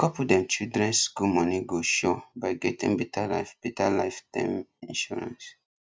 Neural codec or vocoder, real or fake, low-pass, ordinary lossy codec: none; real; none; none